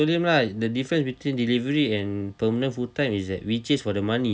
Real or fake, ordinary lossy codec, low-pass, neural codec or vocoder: real; none; none; none